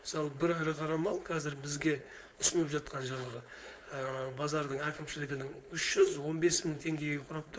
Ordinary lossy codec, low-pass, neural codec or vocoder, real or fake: none; none; codec, 16 kHz, 4.8 kbps, FACodec; fake